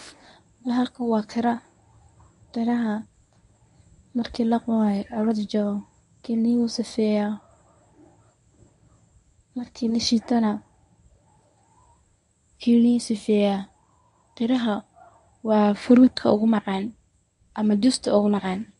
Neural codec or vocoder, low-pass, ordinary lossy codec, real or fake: codec, 24 kHz, 0.9 kbps, WavTokenizer, medium speech release version 1; 10.8 kHz; none; fake